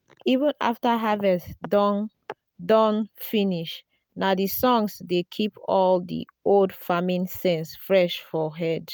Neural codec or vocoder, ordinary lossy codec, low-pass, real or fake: none; none; none; real